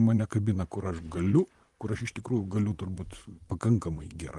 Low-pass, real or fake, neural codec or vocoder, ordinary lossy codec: 10.8 kHz; fake; vocoder, 44.1 kHz, 128 mel bands, Pupu-Vocoder; Opus, 24 kbps